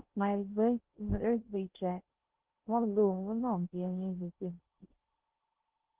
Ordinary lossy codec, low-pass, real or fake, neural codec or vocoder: Opus, 16 kbps; 3.6 kHz; fake; codec, 16 kHz in and 24 kHz out, 0.6 kbps, FocalCodec, streaming, 2048 codes